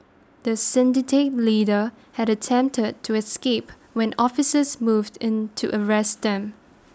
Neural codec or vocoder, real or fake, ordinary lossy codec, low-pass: none; real; none; none